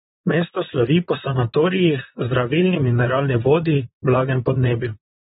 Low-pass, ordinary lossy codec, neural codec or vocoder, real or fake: 19.8 kHz; AAC, 16 kbps; vocoder, 44.1 kHz, 128 mel bands, Pupu-Vocoder; fake